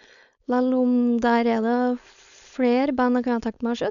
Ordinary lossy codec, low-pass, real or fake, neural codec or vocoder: none; 7.2 kHz; fake; codec, 16 kHz, 4.8 kbps, FACodec